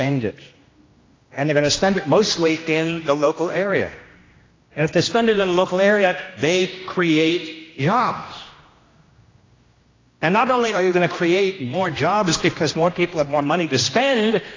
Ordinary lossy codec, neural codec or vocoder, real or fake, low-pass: AAC, 32 kbps; codec, 16 kHz, 1 kbps, X-Codec, HuBERT features, trained on general audio; fake; 7.2 kHz